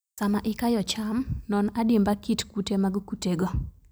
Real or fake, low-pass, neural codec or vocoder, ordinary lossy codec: real; none; none; none